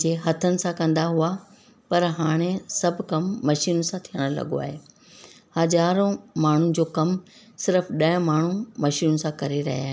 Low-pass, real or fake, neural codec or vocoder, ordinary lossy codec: none; real; none; none